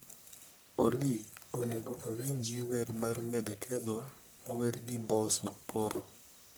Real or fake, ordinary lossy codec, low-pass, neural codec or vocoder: fake; none; none; codec, 44.1 kHz, 1.7 kbps, Pupu-Codec